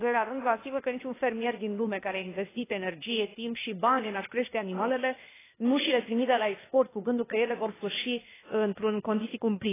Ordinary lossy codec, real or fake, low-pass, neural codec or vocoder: AAC, 16 kbps; fake; 3.6 kHz; codec, 16 kHz, 0.8 kbps, ZipCodec